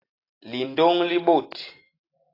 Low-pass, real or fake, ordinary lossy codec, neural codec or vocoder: 5.4 kHz; real; AAC, 32 kbps; none